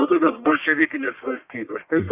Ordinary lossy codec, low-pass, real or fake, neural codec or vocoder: Opus, 64 kbps; 3.6 kHz; fake; codec, 44.1 kHz, 1.7 kbps, Pupu-Codec